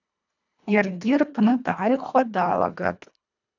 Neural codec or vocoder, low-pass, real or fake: codec, 24 kHz, 1.5 kbps, HILCodec; 7.2 kHz; fake